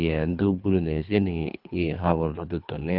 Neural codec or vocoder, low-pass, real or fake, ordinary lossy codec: codec, 24 kHz, 3 kbps, HILCodec; 5.4 kHz; fake; Opus, 24 kbps